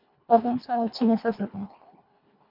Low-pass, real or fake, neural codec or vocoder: 5.4 kHz; fake; codec, 24 kHz, 1.5 kbps, HILCodec